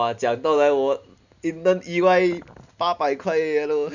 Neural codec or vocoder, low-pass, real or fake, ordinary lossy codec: none; 7.2 kHz; real; none